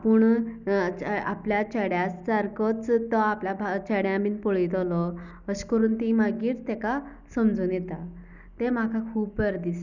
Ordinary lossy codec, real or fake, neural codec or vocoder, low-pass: none; real; none; 7.2 kHz